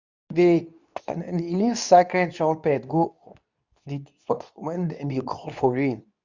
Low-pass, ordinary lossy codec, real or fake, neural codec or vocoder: 7.2 kHz; Opus, 64 kbps; fake; codec, 24 kHz, 0.9 kbps, WavTokenizer, medium speech release version 2